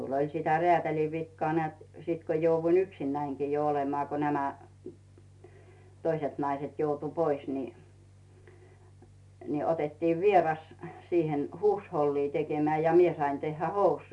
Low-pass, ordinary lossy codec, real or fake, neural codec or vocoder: 10.8 kHz; none; real; none